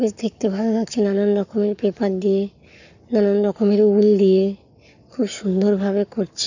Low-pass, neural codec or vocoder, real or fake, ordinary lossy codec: 7.2 kHz; codec, 44.1 kHz, 7.8 kbps, Pupu-Codec; fake; none